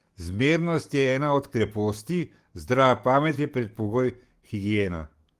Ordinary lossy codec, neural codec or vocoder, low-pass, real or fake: Opus, 24 kbps; codec, 44.1 kHz, 7.8 kbps, DAC; 14.4 kHz; fake